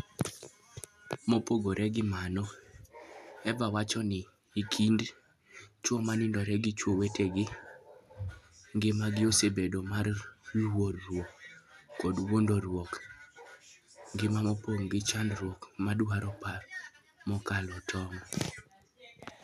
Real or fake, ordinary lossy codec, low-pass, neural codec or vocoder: real; none; 14.4 kHz; none